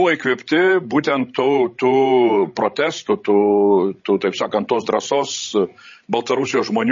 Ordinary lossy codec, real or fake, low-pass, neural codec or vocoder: MP3, 32 kbps; fake; 7.2 kHz; codec, 16 kHz, 16 kbps, FreqCodec, larger model